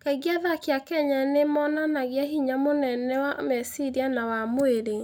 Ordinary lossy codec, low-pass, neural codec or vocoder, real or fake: none; 19.8 kHz; none; real